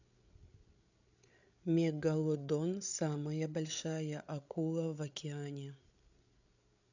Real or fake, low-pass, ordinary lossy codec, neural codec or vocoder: fake; 7.2 kHz; none; codec, 16 kHz, 8 kbps, FreqCodec, larger model